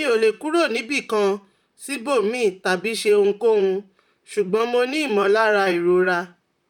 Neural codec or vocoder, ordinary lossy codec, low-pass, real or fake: vocoder, 44.1 kHz, 128 mel bands, Pupu-Vocoder; none; 19.8 kHz; fake